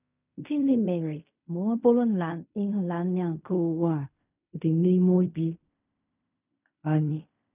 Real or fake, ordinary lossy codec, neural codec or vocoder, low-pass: fake; none; codec, 16 kHz in and 24 kHz out, 0.4 kbps, LongCat-Audio-Codec, fine tuned four codebook decoder; 3.6 kHz